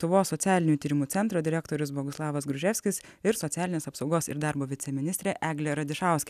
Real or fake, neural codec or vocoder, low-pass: real; none; 14.4 kHz